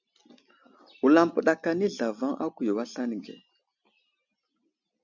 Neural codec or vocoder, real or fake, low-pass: none; real; 7.2 kHz